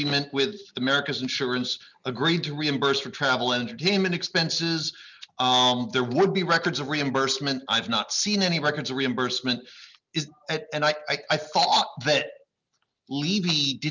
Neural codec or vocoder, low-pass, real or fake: none; 7.2 kHz; real